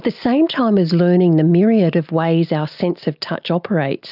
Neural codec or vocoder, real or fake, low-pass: none; real; 5.4 kHz